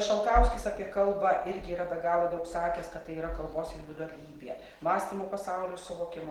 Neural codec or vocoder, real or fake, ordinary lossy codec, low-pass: none; real; Opus, 16 kbps; 19.8 kHz